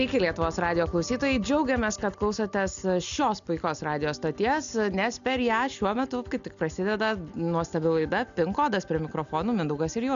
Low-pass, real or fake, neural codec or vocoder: 7.2 kHz; real; none